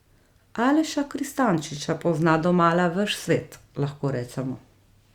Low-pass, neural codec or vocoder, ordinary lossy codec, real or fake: 19.8 kHz; none; Opus, 64 kbps; real